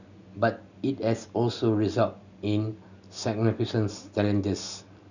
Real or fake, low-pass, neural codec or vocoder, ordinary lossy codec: real; 7.2 kHz; none; none